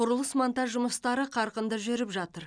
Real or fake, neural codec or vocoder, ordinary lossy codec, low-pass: real; none; none; 9.9 kHz